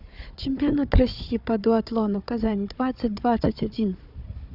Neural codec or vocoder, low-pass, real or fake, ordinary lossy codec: codec, 16 kHz, 4 kbps, FunCodec, trained on Chinese and English, 50 frames a second; 5.4 kHz; fake; none